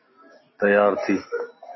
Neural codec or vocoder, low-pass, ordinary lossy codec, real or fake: none; 7.2 kHz; MP3, 24 kbps; real